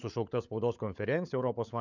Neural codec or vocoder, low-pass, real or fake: codec, 16 kHz, 16 kbps, FunCodec, trained on LibriTTS, 50 frames a second; 7.2 kHz; fake